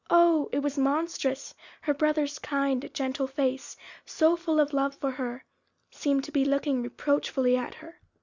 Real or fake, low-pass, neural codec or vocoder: real; 7.2 kHz; none